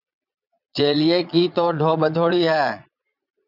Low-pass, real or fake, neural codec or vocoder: 5.4 kHz; fake; vocoder, 22.05 kHz, 80 mel bands, Vocos